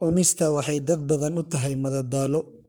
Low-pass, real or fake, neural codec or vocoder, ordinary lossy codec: none; fake; codec, 44.1 kHz, 3.4 kbps, Pupu-Codec; none